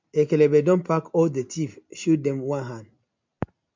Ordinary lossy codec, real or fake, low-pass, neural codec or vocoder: MP3, 64 kbps; real; 7.2 kHz; none